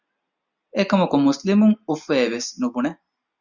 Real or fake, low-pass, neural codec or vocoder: real; 7.2 kHz; none